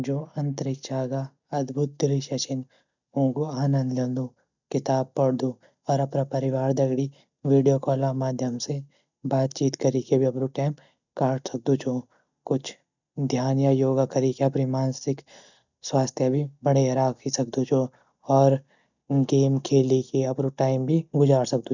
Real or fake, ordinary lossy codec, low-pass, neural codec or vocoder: real; none; 7.2 kHz; none